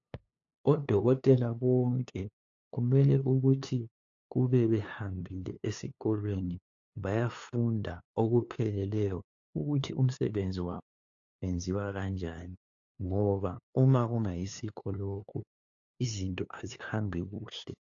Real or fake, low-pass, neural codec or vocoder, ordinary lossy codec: fake; 7.2 kHz; codec, 16 kHz, 2 kbps, FunCodec, trained on LibriTTS, 25 frames a second; AAC, 48 kbps